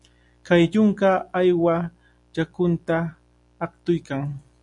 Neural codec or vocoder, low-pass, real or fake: none; 10.8 kHz; real